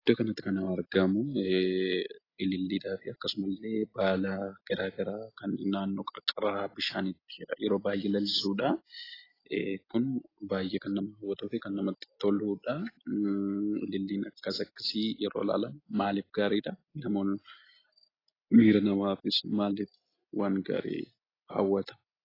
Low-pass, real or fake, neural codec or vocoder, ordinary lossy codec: 5.4 kHz; real; none; AAC, 24 kbps